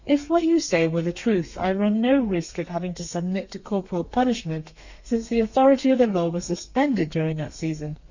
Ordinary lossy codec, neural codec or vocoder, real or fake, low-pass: Opus, 64 kbps; codec, 32 kHz, 1.9 kbps, SNAC; fake; 7.2 kHz